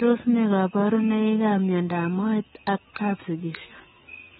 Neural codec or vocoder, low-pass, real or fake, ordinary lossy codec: codec, 24 kHz, 3.1 kbps, DualCodec; 10.8 kHz; fake; AAC, 16 kbps